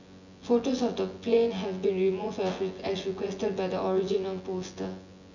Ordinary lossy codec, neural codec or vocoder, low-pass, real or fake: none; vocoder, 24 kHz, 100 mel bands, Vocos; 7.2 kHz; fake